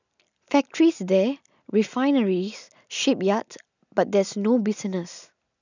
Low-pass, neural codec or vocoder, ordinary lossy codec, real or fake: 7.2 kHz; none; none; real